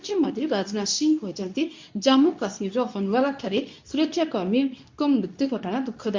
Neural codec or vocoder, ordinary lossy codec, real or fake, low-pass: codec, 24 kHz, 0.9 kbps, WavTokenizer, medium speech release version 2; MP3, 64 kbps; fake; 7.2 kHz